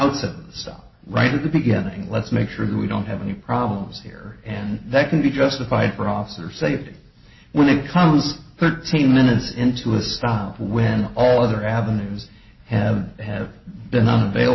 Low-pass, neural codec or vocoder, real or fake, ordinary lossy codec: 7.2 kHz; none; real; MP3, 24 kbps